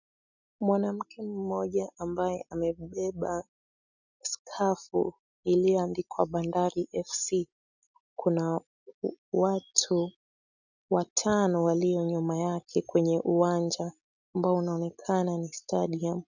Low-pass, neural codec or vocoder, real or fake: 7.2 kHz; none; real